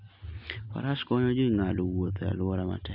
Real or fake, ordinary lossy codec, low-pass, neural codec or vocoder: real; none; 5.4 kHz; none